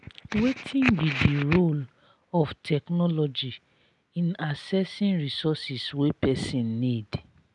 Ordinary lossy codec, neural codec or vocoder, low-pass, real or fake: none; none; 10.8 kHz; real